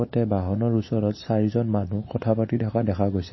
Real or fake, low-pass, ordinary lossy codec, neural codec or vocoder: real; 7.2 kHz; MP3, 24 kbps; none